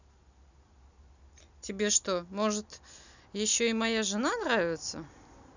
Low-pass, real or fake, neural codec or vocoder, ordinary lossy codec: 7.2 kHz; real; none; none